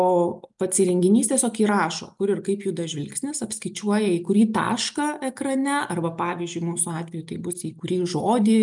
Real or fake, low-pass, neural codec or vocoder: real; 10.8 kHz; none